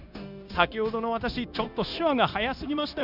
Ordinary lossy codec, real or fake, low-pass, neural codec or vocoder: none; fake; 5.4 kHz; codec, 16 kHz, 0.9 kbps, LongCat-Audio-Codec